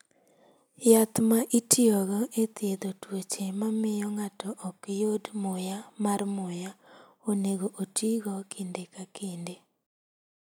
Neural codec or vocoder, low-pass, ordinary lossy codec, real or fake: none; none; none; real